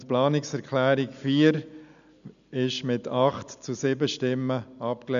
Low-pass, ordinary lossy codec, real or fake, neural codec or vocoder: 7.2 kHz; none; real; none